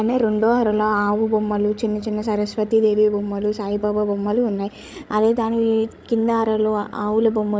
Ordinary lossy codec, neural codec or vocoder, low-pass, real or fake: none; codec, 16 kHz, 16 kbps, FreqCodec, larger model; none; fake